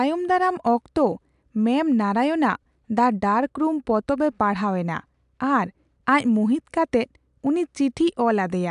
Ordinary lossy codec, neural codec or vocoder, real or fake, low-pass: AAC, 96 kbps; none; real; 10.8 kHz